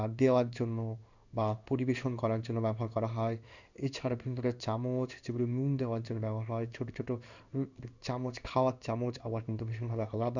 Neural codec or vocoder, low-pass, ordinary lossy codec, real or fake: codec, 16 kHz in and 24 kHz out, 1 kbps, XY-Tokenizer; 7.2 kHz; none; fake